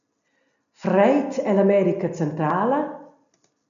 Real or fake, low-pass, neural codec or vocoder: real; 7.2 kHz; none